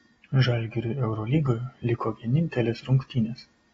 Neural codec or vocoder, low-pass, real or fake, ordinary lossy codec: none; 19.8 kHz; real; AAC, 24 kbps